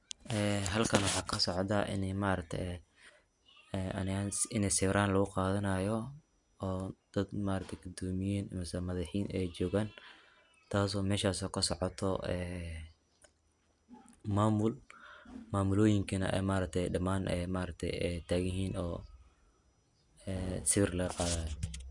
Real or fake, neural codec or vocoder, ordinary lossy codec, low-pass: real; none; none; 10.8 kHz